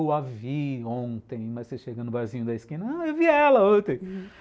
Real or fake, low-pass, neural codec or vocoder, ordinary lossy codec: real; none; none; none